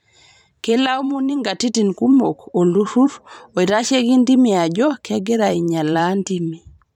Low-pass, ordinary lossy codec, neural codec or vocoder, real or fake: 19.8 kHz; none; vocoder, 44.1 kHz, 128 mel bands every 512 samples, BigVGAN v2; fake